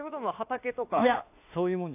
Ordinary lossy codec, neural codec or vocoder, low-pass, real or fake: AAC, 24 kbps; autoencoder, 48 kHz, 32 numbers a frame, DAC-VAE, trained on Japanese speech; 3.6 kHz; fake